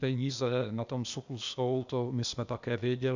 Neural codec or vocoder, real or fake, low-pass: codec, 16 kHz, 0.8 kbps, ZipCodec; fake; 7.2 kHz